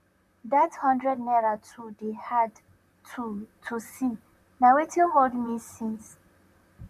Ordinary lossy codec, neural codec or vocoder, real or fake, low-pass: MP3, 96 kbps; vocoder, 44.1 kHz, 128 mel bands, Pupu-Vocoder; fake; 14.4 kHz